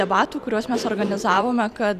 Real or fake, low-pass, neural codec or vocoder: fake; 14.4 kHz; vocoder, 44.1 kHz, 128 mel bands every 512 samples, BigVGAN v2